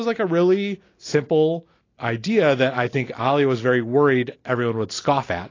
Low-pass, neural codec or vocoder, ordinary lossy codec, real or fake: 7.2 kHz; none; AAC, 32 kbps; real